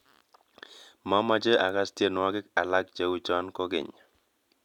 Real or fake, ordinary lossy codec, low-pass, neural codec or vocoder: real; none; 19.8 kHz; none